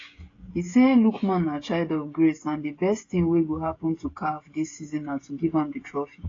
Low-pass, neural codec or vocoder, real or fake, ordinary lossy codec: 7.2 kHz; codec, 16 kHz, 16 kbps, FreqCodec, smaller model; fake; AAC, 32 kbps